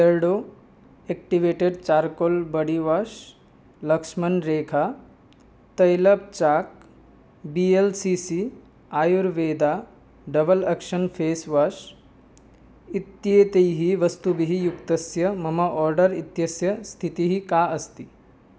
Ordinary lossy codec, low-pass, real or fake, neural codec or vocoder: none; none; real; none